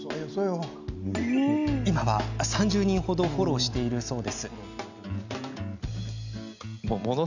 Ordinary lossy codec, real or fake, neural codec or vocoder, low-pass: none; real; none; 7.2 kHz